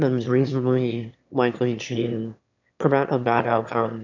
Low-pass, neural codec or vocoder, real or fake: 7.2 kHz; autoencoder, 22.05 kHz, a latent of 192 numbers a frame, VITS, trained on one speaker; fake